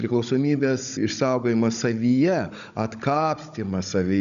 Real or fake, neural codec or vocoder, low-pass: fake; codec, 16 kHz, 16 kbps, FunCodec, trained on Chinese and English, 50 frames a second; 7.2 kHz